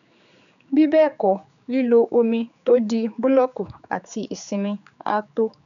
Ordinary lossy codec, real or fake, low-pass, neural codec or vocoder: none; fake; 7.2 kHz; codec, 16 kHz, 4 kbps, X-Codec, HuBERT features, trained on general audio